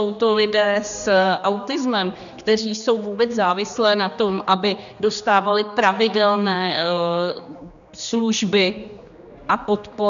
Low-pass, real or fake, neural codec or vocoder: 7.2 kHz; fake; codec, 16 kHz, 2 kbps, X-Codec, HuBERT features, trained on general audio